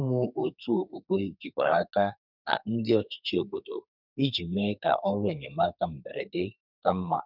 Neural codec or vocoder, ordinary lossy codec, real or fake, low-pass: codec, 32 kHz, 1.9 kbps, SNAC; none; fake; 5.4 kHz